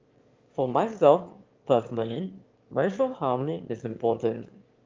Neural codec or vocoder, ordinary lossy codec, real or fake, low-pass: autoencoder, 22.05 kHz, a latent of 192 numbers a frame, VITS, trained on one speaker; Opus, 32 kbps; fake; 7.2 kHz